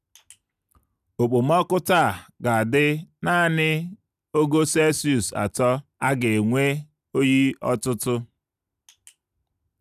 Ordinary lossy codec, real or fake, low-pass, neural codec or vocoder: none; real; 14.4 kHz; none